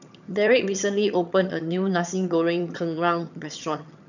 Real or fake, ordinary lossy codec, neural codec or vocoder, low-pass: fake; none; vocoder, 22.05 kHz, 80 mel bands, HiFi-GAN; 7.2 kHz